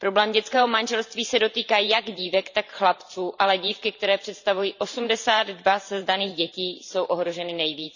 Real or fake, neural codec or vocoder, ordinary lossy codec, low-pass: fake; vocoder, 44.1 kHz, 128 mel bands every 512 samples, BigVGAN v2; none; 7.2 kHz